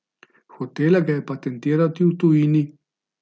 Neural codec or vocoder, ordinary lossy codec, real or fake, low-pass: none; none; real; none